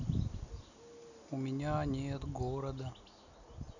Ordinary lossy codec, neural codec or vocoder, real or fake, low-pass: none; none; real; 7.2 kHz